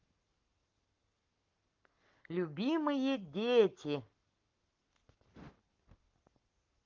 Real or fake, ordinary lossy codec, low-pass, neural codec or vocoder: fake; Opus, 32 kbps; 7.2 kHz; codec, 44.1 kHz, 7.8 kbps, Pupu-Codec